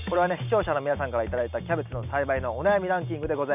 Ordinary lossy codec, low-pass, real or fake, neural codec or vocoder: none; 3.6 kHz; real; none